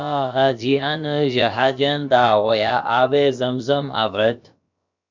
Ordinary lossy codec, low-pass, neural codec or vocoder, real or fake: MP3, 64 kbps; 7.2 kHz; codec, 16 kHz, about 1 kbps, DyCAST, with the encoder's durations; fake